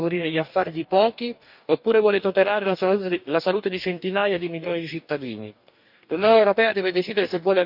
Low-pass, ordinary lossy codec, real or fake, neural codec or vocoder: 5.4 kHz; none; fake; codec, 44.1 kHz, 2.6 kbps, DAC